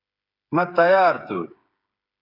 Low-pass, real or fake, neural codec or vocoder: 5.4 kHz; fake; codec, 16 kHz, 8 kbps, FreqCodec, smaller model